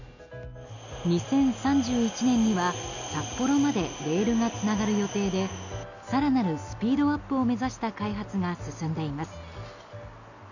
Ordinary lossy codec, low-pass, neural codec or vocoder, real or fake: none; 7.2 kHz; none; real